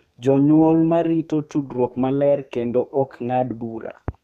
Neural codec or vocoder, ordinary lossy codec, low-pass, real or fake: codec, 32 kHz, 1.9 kbps, SNAC; none; 14.4 kHz; fake